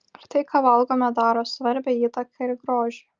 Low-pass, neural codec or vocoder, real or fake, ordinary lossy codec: 7.2 kHz; none; real; Opus, 24 kbps